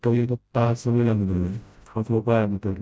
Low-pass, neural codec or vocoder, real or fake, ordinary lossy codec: none; codec, 16 kHz, 0.5 kbps, FreqCodec, smaller model; fake; none